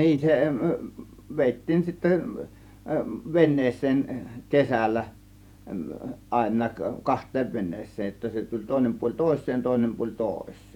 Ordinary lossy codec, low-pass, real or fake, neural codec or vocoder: none; 19.8 kHz; fake; vocoder, 48 kHz, 128 mel bands, Vocos